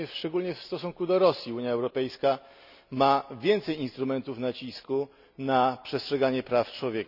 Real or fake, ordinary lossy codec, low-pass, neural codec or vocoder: real; none; 5.4 kHz; none